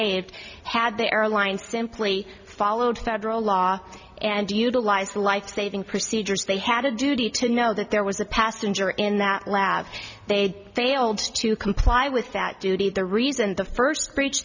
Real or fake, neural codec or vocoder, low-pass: real; none; 7.2 kHz